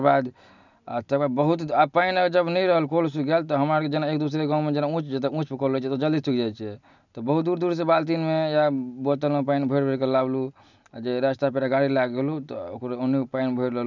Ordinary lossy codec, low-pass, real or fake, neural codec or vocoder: none; 7.2 kHz; real; none